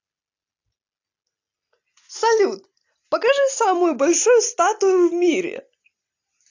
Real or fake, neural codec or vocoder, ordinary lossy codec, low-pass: real; none; AAC, 48 kbps; 7.2 kHz